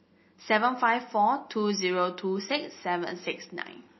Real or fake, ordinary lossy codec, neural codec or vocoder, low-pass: real; MP3, 24 kbps; none; 7.2 kHz